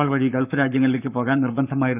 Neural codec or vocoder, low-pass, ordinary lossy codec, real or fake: codec, 44.1 kHz, 7.8 kbps, Pupu-Codec; 3.6 kHz; none; fake